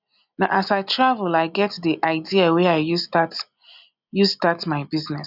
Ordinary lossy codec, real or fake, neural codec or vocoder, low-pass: none; real; none; 5.4 kHz